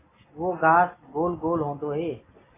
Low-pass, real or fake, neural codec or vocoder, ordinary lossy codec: 3.6 kHz; real; none; AAC, 16 kbps